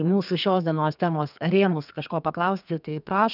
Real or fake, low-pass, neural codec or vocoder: fake; 5.4 kHz; codec, 32 kHz, 1.9 kbps, SNAC